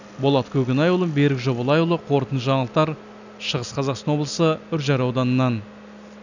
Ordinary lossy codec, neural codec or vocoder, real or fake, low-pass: none; none; real; 7.2 kHz